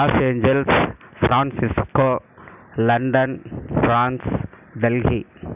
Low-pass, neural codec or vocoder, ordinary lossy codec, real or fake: 3.6 kHz; none; none; real